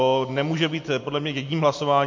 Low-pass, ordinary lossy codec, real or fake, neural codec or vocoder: 7.2 kHz; MP3, 48 kbps; real; none